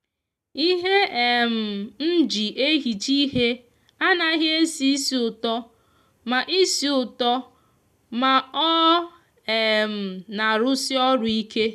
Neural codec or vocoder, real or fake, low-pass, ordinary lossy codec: none; real; 14.4 kHz; none